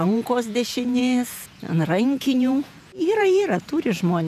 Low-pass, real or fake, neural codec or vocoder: 14.4 kHz; fake; vocoder, 48 kHz, 128 mel bands, Vocos